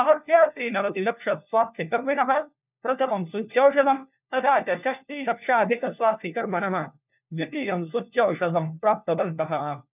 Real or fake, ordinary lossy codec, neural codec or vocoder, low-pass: fake; none; codec, 16 kHz, 1 kbps, FunCodec, trained on LibriTTS, 50 frames a second; 3.6 kHz